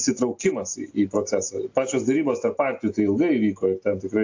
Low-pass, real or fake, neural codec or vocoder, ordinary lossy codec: 7.2 kHz; real; none; AAC, 48 kbps